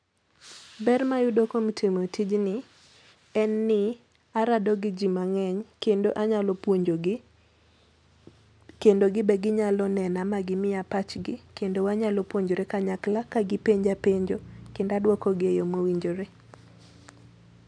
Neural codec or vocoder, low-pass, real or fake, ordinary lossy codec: none; 9.9 kHz; real; none